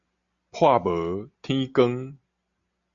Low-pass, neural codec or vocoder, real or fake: 7.2 kHz; none; real